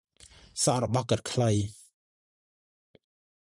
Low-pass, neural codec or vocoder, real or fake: 10.8 kHz; vocoder, 44.1 kHz, 128 mel bands every 512 samples, BigVGAN v2; fake